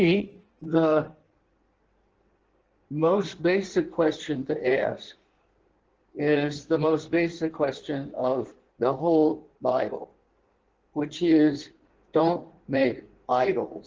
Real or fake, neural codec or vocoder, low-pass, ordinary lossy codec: fake; codec, 16 kHz in and 24 kHz out, 1.1 kbps, FireRedTTS-2 codec; 7.2 kHz; Opus, 16 kbps